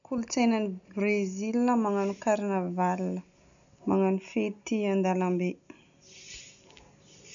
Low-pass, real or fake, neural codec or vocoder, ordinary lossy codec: 7.2 kHz; real; none; none